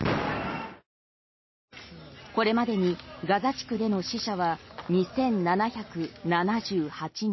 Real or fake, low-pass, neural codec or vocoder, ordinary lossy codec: real; 7.2 kHz; none; MP3, 24 kbps